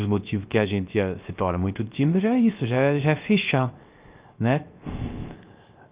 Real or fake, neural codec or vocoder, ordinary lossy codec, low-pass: fake; codec, 16 kHz, 0.3 kbps, FocalCodec; Opus, 32 kbps; 3.6 kHz